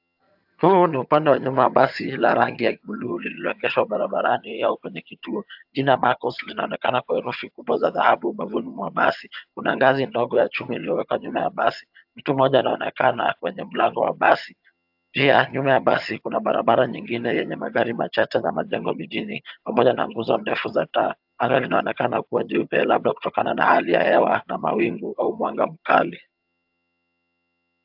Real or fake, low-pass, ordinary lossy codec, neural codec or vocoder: fake; 5.4 kHz; AAC, 48 kbps; vocoder, 22.05 kHz, 80 mel bands, HiFi-GAN